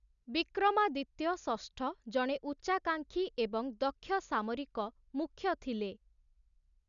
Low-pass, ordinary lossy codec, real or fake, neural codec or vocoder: 7.2 kHz; none; real; none